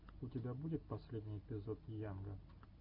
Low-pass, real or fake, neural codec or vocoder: 5.4 kHz; real; none